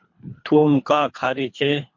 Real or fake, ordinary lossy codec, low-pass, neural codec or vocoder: fake; MP3, 48 kbps; 7.2 kHz; codec, 24 kHz, 3 kbps, HILCodec